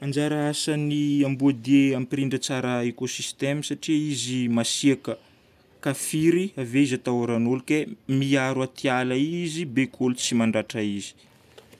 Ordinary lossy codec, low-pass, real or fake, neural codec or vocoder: none; 14.4 kHz; real; none